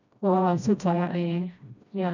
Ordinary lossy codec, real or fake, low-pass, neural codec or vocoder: none; fake; 7.2 kHz; codec, 16 kHz, 1 kbps, FreqCodec, smaller model